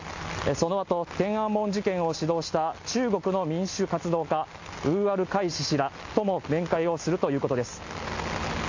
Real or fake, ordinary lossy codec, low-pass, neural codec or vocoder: real; AAC, 48 kbps; 7.2 kHz; none